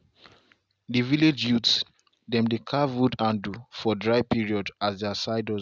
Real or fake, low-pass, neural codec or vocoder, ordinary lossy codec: real; none; none; none